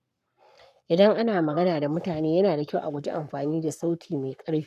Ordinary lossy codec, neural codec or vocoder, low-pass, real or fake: none; codec, 44.1 kHz, 7.8 kbps, Pupu-Codec; 14.4 kHz; fake